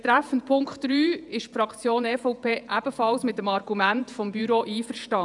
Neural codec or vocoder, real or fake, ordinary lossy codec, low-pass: vocoder, 48 kHz, 128 mel bands, Vocos; fake; none; 10.8 kHz